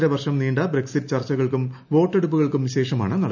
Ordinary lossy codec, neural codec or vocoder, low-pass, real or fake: none; none; 7.2 kHz; real